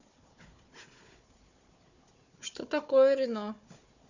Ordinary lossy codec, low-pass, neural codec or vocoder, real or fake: none; 7.2 kHz; codec, 16 kHz, 4 kbps, FunCodec, trained on Chinese and English, 50 frames a second; fake